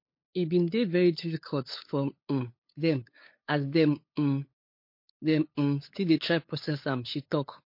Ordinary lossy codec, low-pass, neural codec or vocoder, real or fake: MP3, 32 kbps; 5.4 kHz; codec, 16 kHz, 8 kbps, FunCodec, trained on LibriTTS, 25 frames a second; fake